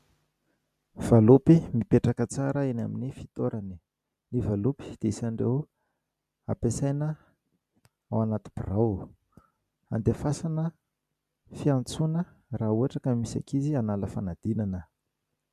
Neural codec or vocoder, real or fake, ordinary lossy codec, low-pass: none; real; MP3, 96 kbps; 14.4 kHz